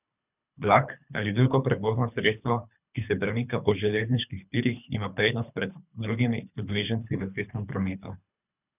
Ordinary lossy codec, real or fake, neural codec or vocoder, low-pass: none; fake; codec, 24 kHz, 3 kbps, HILCodec; 3.6 kHz